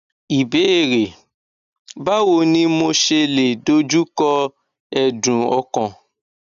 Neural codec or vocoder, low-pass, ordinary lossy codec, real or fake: none; 7.2 kHz; none; real